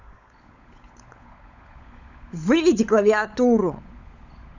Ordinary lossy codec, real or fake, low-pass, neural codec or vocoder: none; fake; 7.2 kHz; codec, 16 kHz, 16 kbps, FunCodec, trained on LibriTTS, 50 frames a second